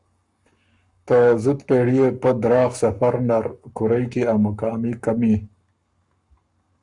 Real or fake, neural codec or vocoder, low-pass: fake; codec, 44.1 kHz, 7.8 kbps, Pupu-Codec; 10.8 kHz